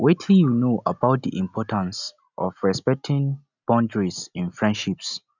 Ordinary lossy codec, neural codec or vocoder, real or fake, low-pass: none; none; real; 7.2 kHz